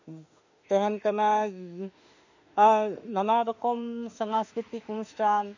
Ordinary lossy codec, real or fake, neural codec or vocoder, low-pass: none; fake; autoencoder, 48 kHz, 32 numbers a frame, DAC-VAE, trained on Japanese speech; 7.2 kHz